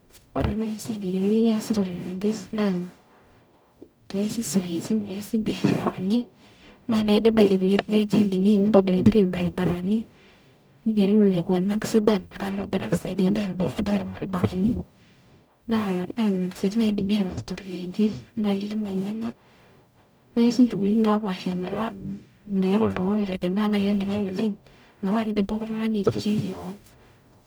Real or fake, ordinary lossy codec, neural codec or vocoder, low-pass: fake; none; codec, 44.1 kHz, 0.9 kbps, DAC; none